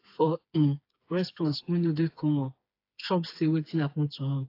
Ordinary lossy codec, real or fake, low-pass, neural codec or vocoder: AAC, 32 kbps; fake; 5.4 kHz; codec, 16 kHz, 4 kbps, FreqCodec, smaller model